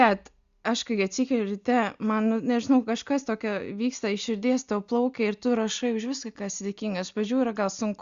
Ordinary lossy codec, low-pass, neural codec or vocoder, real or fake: Opus, 64 kbps; 7.2 kHz; none; real